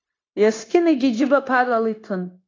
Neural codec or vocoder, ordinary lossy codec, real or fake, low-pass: codec, 16 kHz, 0.9 kbps, LongCat-Audio-Codec; AAC, 32 kbps; fake; 7.2 kHz